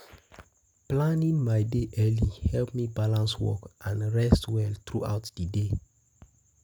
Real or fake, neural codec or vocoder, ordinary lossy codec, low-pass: real; none; none; none